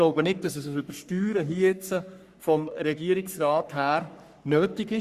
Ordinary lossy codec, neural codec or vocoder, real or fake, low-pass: Opus, 64 kbps; codec, 44.1 kHz, 3.4 kbps, Pupu-Codec; fake; 14.4 kHz